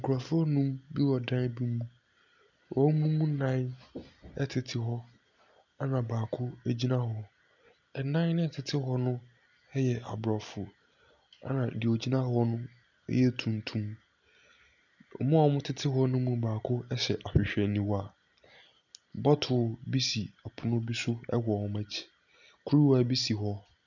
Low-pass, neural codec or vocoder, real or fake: 7.2 kHz; none; real